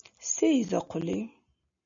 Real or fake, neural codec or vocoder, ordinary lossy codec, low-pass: real; none; MP3, 96 kbps; 7.2 kHz